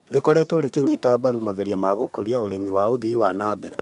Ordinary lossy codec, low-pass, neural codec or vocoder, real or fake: none; 10.8 kHz; codec, 24 kHz, 1 kbps, SNAC; fake